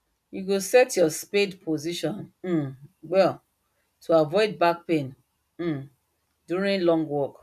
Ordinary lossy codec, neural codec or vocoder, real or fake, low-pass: none; none; real; 14.4 kHz